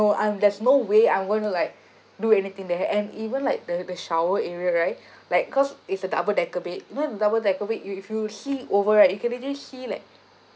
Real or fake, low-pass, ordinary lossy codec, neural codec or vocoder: real; none; none; none